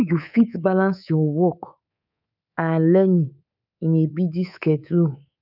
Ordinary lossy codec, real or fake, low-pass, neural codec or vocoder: none; fake; 5.4 kHz; codec, 24 kHz, 3.1 kbps, DualCodec